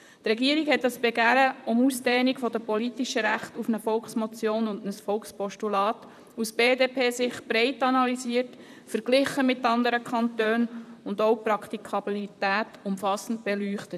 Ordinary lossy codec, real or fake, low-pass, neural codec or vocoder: none; fake; 14.4 kHz; vocoder, 44.1 kHz, 128 mel bands, Pupu-Vocoder